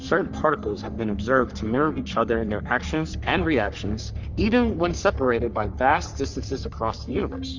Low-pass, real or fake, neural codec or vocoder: 7.2 kHz; fake; codec, 44.1 kHz, 3.4 kbps, Pupu-Codec